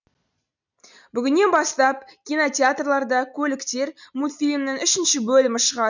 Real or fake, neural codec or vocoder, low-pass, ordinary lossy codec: real; none; 7.2 kHz; none